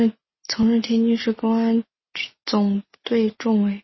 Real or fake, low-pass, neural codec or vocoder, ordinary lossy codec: real; 7.2 kHz; none; MP3, 24 kbps